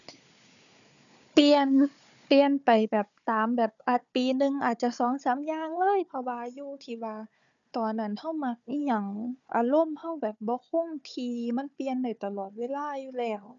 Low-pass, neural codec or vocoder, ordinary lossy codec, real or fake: 7.2 kHz; codec, 16 kHz, 4 kbps, FunCodec, trained on Chinese and English, 50 frames a second; none; fake